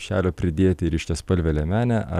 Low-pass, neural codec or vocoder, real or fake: 14.4 kHz; none; real